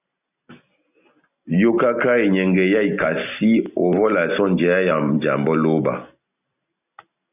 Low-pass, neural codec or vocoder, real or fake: 3.6 kHz; none; real